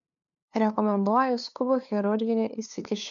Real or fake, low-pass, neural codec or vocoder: fake; 7.2 kHz; codec, 16 kHz, 2 kbps, FunCodec, trained on LibriTTS, 25 frames a second